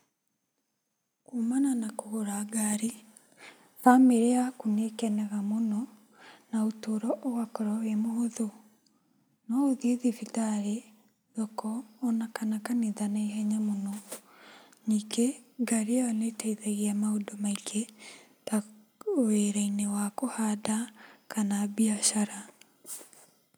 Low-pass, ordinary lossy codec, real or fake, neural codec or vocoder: none; none; real; none